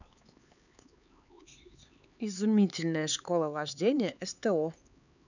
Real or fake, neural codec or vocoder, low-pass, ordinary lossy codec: fake; codec, 16 kHz, 4 kbps, X-Codec, HuBERT features, trained on LibriSpeech; 7.2 kHz; none